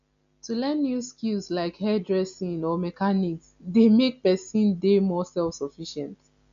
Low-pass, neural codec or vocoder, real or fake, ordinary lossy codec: 7.2 kHz; none; real; none